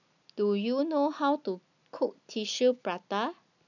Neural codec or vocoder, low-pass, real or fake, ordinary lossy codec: none; 7.2 kHz; real; none